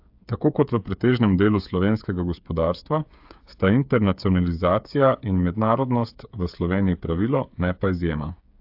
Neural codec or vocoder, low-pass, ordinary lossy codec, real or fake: codec, 16 kHz, 8 kbps, FreqCodec, smaller model; 5.4 kHz; AAC, 48 kbps; fake